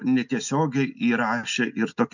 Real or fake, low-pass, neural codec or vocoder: real; 7.2 kHz; none